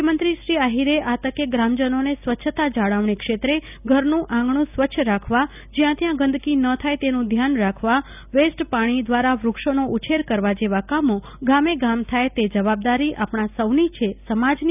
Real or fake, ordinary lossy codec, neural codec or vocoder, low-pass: real; none; none; 3.6 kHz